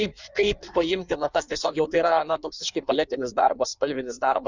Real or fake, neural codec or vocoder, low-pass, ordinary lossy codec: fake; codec, 16 kHz in and 24 kHz out, 1.1 kbps, FireRedTTS-2 codec; 7.2 kHz; Opus, 64 kbps